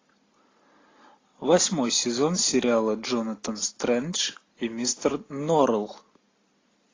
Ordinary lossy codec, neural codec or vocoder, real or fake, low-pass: AAC, 32 kbps; none; real; 7.2 kHz